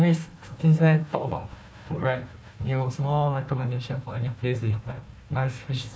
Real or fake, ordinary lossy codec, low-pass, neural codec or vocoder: fake; none; none; codec, 16 kHz, 1 kbps, FunCodec, trained on Chinese and English, 50 frames a second